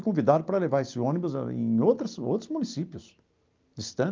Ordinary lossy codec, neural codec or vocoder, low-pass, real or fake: Opus, 24 kbps; none; 7.2 kHz; real